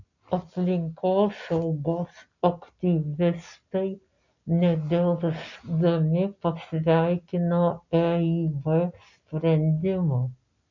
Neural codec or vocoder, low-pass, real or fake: none; 7.2 kHz; real